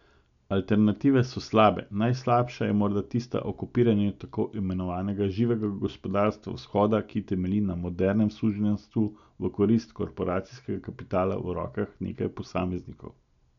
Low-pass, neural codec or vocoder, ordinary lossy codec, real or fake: 7.2 kHz; none; none; real